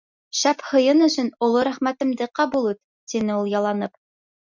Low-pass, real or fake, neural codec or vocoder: 7.2 kHz; real; none